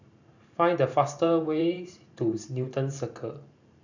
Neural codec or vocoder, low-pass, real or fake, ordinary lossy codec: vocoder, 44.1 kHz, 128 mel bands every 512 samples, BigVGAN v2; 7.2 kHz; fake; none